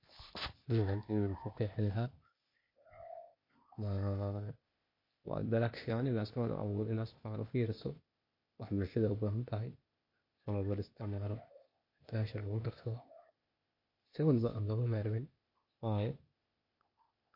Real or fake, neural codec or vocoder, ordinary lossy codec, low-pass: fake; codec, 16 kHz, 0.8 kbps, ZipCodec; MP3, 32 kbps; 5.4 kHz